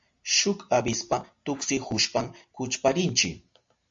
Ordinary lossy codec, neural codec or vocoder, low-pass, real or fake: AAC, 64 kbps; none; 7.2 kHz; real